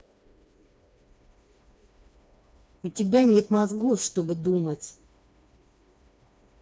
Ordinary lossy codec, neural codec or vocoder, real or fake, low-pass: none; codec, 16 kHz, 2 kbps, FreqCodec, smaller model; fake; none